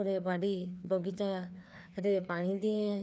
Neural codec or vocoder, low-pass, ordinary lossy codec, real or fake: codec, 16 kHz, 2 kbps, FreqCodec, larger model; none; none; fake